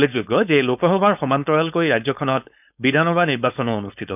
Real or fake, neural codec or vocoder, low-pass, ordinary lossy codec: fake; codec, 16 kHz, 4.8 kbps, FACodec; 3.6 kHz; none